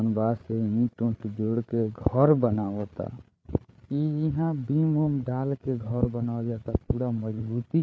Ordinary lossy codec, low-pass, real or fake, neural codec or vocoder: none; none; fake; codec, 16 kHz, 8 kbps, FreqCodec, larger model